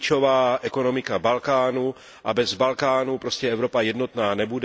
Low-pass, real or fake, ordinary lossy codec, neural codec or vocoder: none; real; none; none